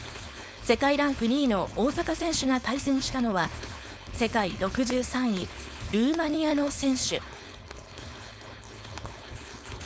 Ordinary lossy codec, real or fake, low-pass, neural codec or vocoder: none; fake; none; codec, 16 kHz, 4.8 kbps, FACodec